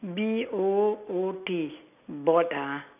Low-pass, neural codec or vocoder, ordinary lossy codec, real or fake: 3.6 kHz; none; none; real